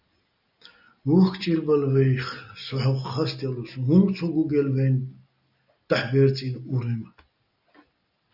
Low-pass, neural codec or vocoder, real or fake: 5.4 kHz; none; real